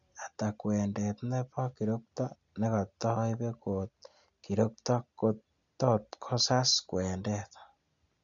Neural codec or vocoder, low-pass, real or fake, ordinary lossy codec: none; 7.2 kHz; real; none